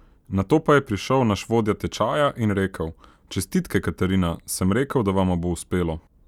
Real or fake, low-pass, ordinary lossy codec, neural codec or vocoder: real; 19.8 kHz; none; none